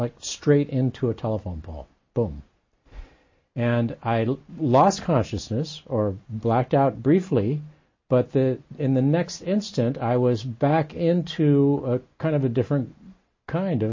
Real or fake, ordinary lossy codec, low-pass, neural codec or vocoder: real; MP3, 32 kbps; 7.2 kHz; none